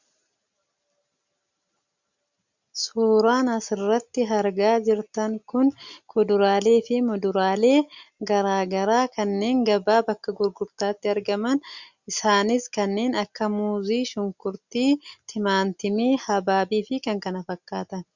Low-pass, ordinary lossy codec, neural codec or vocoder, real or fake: 7.2 kHz; Opus, 64 kbps; none; real